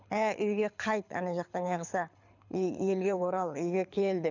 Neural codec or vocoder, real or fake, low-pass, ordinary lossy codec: codec, 24 kHz, 6 kbps, HILCodec; fake; 7.2 kHz; none